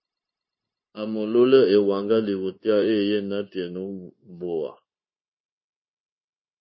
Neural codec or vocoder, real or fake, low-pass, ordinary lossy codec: codec, 16 kHz, 0.9 kbps, LongCat-Audio-Codec; fake; 7.2 kHz; MP3, 24 kbps